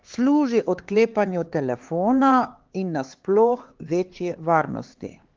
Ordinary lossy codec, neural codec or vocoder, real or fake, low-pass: Opus, 16 kbps; codec, 16 kHz, 4 kbps, X-Codec, HuBERT features, trained on LibriSpeech; fake; 7.2 kHz